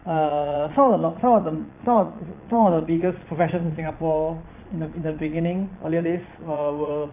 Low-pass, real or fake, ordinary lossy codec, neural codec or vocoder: 3.6 kHz; fake; none; vocoder, 22.05 kHz, 80 mel bands, Vocos